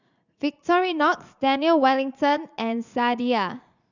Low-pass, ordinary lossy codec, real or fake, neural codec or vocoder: 7.2 kHz; none; fake; vocoder, 44.1 kHz, 80 mel bands, Vocos